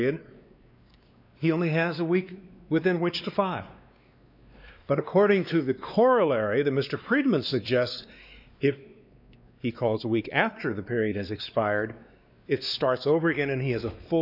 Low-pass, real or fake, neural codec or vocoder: 5.4 kHz; fake; codec, 16 kHz, 2 kbps, X-Codec, WavLM features, trained on Multilingual LibriSpeech